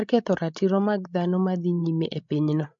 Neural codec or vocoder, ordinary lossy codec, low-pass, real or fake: codec, 16 kHz, 16 kbps, FreqCodec, larger model; MP3, 48 kbps; 7.2 kHz; fake